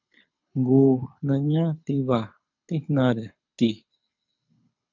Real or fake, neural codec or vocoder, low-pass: fake; codec, 24 kHz, 6 kbps, HILCodec; 7.2 kHz